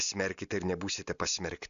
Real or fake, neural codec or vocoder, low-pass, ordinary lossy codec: real; none; 7.2 kHz; AAC, 96 kbps